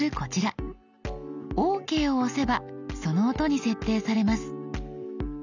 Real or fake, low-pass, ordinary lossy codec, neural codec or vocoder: real; 7.2 kHz; none; none